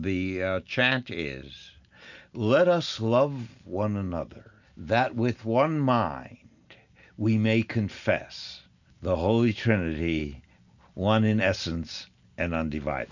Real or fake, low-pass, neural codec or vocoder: real; 7.2 kHz; none